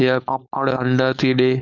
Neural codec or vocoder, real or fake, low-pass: codec, 16 kHz, 4.8 kbps, FACodec; fake; 7.2 kHz